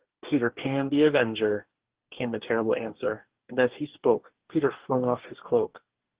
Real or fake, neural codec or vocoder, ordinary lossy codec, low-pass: fake; codec, 44.1 kHz, 2.6 kbps, DAC; Opus, 16 kbps; 3.6 kHz